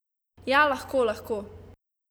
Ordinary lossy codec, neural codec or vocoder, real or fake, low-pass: none; none; real; none